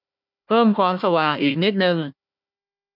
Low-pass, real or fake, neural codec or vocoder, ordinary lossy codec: 5.4 kHz; fake; codec, 16 kHz, 1 kbps, FunCodec, trained on Chinese and English, 50 frames a second; none